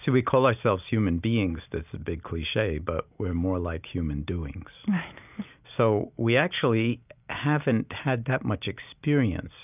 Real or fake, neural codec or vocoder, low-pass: real; none; 3.6 kHz